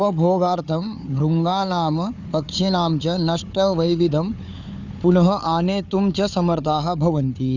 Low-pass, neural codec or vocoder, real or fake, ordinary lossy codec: 7.2 kHz; codec, 16 kHz, 4 kbps, FunCodec, trained on Chinese and English, 50 frames a second; fake; none